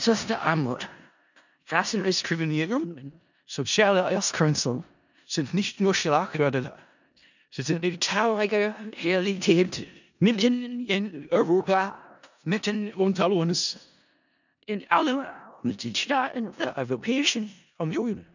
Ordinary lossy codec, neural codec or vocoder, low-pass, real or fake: none; codec, 16 kHz in and 24 kHz out, 0.4 kbps, LongCat-Audio-Codec, four codebook decoder; 7.2 kHz; fake